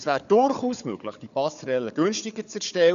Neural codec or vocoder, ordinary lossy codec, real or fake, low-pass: codec, 16 kHz, 4 kbps, FunCodec, trained on Chinese and English, 50 frames a second; MP3, 96 kbps; fake; 7.2 kHz